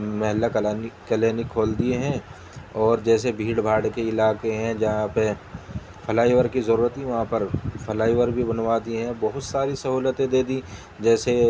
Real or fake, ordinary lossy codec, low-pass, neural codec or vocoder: real; none; none; none